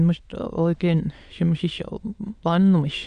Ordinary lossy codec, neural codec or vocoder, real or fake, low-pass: Opus, 64 kbps; autoencoder, 22.05 kHz, a latent of 192 numbers a frame, VITS, trained on many speakers; fake; 9.9 kHz